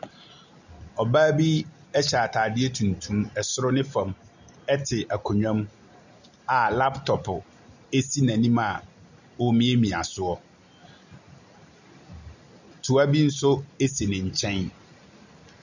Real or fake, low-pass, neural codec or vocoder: real; 7.2 kHz; none